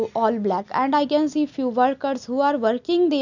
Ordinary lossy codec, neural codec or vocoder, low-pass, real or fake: none; none; 7.2 kHz; real